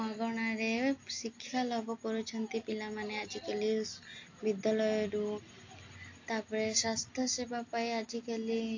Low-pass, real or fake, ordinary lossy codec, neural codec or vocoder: 7.2 kHz; real; AAC, 48 kbps; none